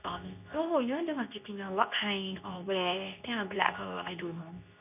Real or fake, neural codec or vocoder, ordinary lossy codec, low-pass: fake; codec, 24 kHz, 0.9 kbps, WavTokenizer, medium speech release version 2; none; 3.6 kHz